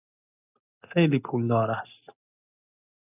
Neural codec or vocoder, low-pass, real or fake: none; 3.6 kHz; real